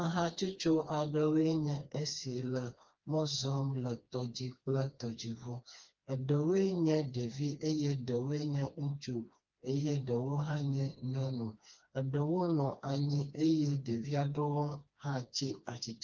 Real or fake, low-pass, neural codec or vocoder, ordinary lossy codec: fake; 7.2 kHz; codec, 16 kHz, 2 kbps, FreqCodec, smaller model; Opus, 24 kbps